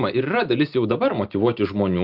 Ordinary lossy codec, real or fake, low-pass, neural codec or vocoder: Opus, 24 kbps; real; 5.4 kHz; none